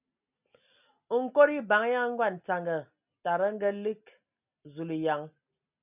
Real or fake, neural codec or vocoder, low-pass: real; none; 3.6 kHz